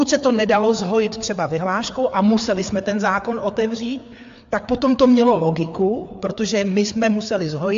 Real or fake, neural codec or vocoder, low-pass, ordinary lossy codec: fake; codec, 16 kHz, 4 kbps, FreqCodec, larger model; 7.2 kHz; AAC, 64 kbps